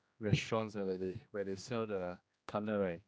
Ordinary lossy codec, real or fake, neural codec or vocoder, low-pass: none; fake; codec, 16 kHz, 1 kbps, X-Codec, HuBERT features, trained on general audio; none